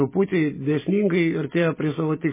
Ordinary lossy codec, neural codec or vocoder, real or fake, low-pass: MP3, 16 kbps; none; real; 3.6 kHz